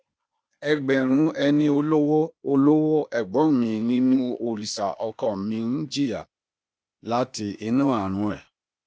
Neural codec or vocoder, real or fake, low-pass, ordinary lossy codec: codec, 16 kHz, 0.8 kbps, ZipCodec; fake; none; none